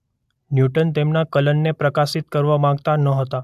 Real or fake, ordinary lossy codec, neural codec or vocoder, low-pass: real; AAC, 96 kbps; none; 14.4 kHz